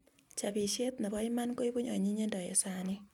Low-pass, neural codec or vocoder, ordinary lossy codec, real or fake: 19.8 kHz; none; none; real